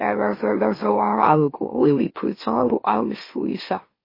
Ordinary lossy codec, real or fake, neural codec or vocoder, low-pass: MP3, 24 kbps; fake; autoencoder, 44.1 kHz, a latent of 192 numbers a frame, MeloTTS; 5.4 kHz